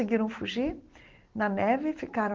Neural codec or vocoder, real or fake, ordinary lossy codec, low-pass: none; real; Opus, 16 kbps; 7.2 kHz